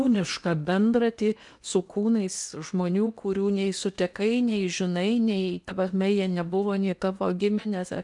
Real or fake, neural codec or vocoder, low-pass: fake; codec, 16 kHz in and 24 kHz out, 0.8 kbps, FocalCodec, streaming, 65536 codes; 10.8 kHz